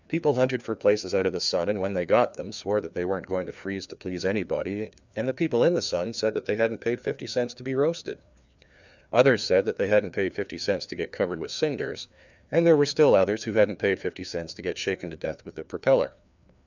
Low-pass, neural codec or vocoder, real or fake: 7.2 kHz; codec, 16 kHz, 2 kbps, FreqCodec, larger model; fake